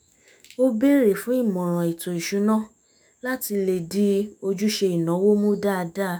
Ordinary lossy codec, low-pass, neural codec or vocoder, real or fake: none; none; autoencoder, 48 kHz, 128 numbers a frame, DAC-VAE, trained on Japanese speech; fake